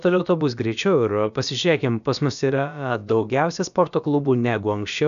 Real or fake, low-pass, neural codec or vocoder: fake; 7.2 kHz; codec, 16 kHz, about 1 kbps, DyCAST, with the encoder's durations